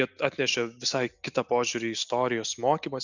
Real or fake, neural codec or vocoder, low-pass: real; none; 7.2 kHz